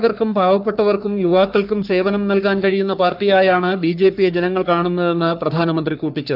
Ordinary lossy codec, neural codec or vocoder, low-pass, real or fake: none; codec, 44.1 kHz, 3.4 kbps, Pupu-Codec; 5.4 kHz; fake